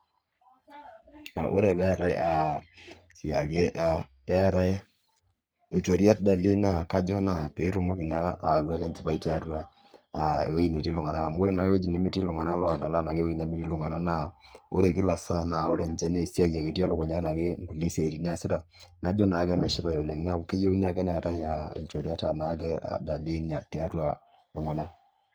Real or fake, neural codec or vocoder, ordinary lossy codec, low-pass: fake; codec, 44.1 kHz, 3.4 kbps, Pupu-Codec; none; none